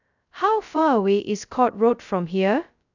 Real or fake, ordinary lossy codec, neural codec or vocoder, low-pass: fake; none; codec, 16 kHz, 0.2 kbps, FocalCodec; 7.2 kHz